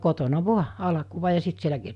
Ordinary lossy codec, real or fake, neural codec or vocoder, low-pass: none; real; none; 10.8 kHz